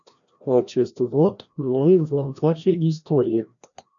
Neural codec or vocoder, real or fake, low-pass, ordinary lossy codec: codec, 16 kHz, 1 kbps, FreqCodec, larger model; fake; 7.2 kHz; MP3, 96 kbps